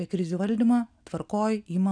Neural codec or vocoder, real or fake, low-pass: none; real; 9.9 kHz